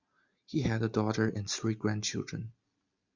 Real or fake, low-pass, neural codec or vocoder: real; 7.2 kHz; none